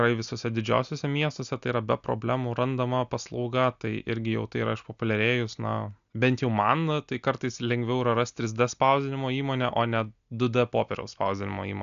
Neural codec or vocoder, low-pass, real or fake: none; 7.2 kHz; real